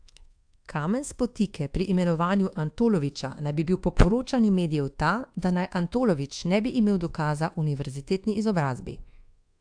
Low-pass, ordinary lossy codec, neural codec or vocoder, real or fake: 9.9 kHz; AAC, 64 kbps; codec, 24 kHz, 1.2 kbps, DualCodec; fake